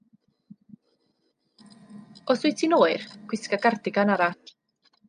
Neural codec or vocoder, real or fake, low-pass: none; real; 9.9 kHz